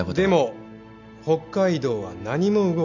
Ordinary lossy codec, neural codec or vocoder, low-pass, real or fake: none; none; 7.2 kHz; real